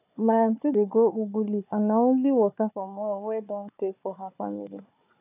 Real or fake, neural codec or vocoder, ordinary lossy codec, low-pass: fake; codec, 16 kHz, 4 kbps, FunCodec, trained on Chinese and English, 50 frames a second; AAC, 32 kbps; 3.6 kHz